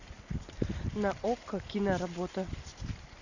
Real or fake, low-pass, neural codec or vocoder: real; 7.2 kHz; none